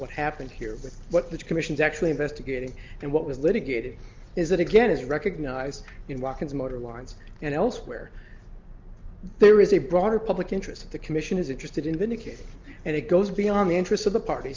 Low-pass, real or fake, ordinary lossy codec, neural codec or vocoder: 7.2 kHz; real; Opus, 24 kbps; none